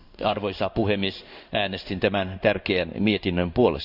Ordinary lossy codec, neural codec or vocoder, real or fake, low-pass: none; codec, 16 kHz in and 24 kHz out, 1 kbps, XY-Tokenizer; fake; 5.4 kHz